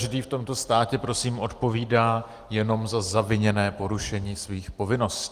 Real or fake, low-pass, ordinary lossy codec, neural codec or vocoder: real; 14.4 kHz; Opus, 24 kbps; none